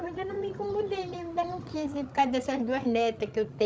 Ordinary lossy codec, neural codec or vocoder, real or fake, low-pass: none; codec, 16 kHz, 16 kbps, FreqCodec, larger model; fake; none